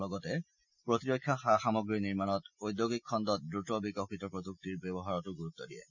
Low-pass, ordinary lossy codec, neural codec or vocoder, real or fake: 7.2 kHz; none; none; real